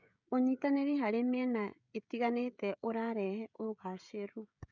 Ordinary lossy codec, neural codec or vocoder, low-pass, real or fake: none; codec, 16 kHz, 16 kbps, FreqCodec, smaller model; 7.2 kHz; fake